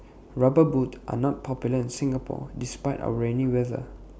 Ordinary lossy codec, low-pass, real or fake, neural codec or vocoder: none; none; real; none